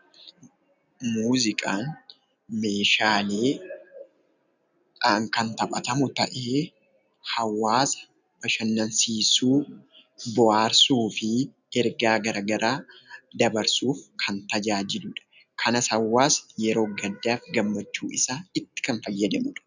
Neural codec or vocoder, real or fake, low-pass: none; real; 7.2 kHz